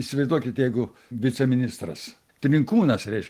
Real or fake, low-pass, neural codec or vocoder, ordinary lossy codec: real; 14.4 kHz; none; Opus, 24 kbps